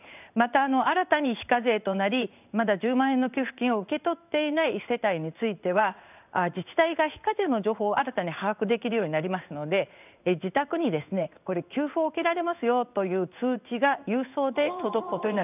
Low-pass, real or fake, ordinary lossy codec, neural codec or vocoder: 3.6 kHz; fake; none; codec, 16 kHz in and 24 kHz out, 1 kbps, XY-Tokenizer